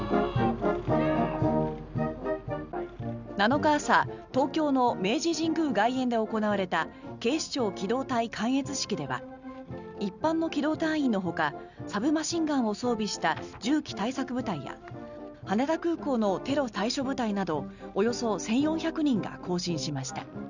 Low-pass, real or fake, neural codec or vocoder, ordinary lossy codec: 7.2 kHz; real; none; none